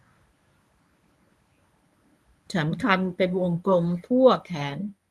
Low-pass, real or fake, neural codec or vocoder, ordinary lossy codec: none; fake; codec, 24 kHz, 0.9 kbps, WavTokenizer, medium speech release version 1; none